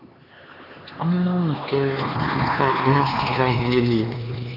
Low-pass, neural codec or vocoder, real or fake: 5.4 kHz; codec, 16 kHz, 2 kbps, X-Codec, HuBERT features, trained on LibriSpeech; fake